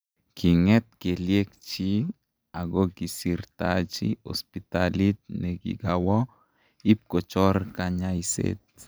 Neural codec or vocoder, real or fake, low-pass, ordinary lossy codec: none; real; none; none